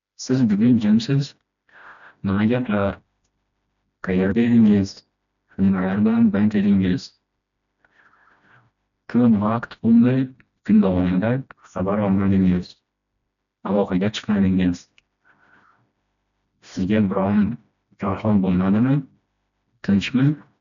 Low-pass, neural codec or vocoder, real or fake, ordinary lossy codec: 7.2 kHz; codec, 16 kHz, 1 kbps, FreqCodec, smaller model; fake; none